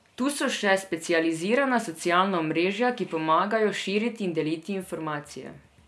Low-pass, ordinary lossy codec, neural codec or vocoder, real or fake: none; none; none; real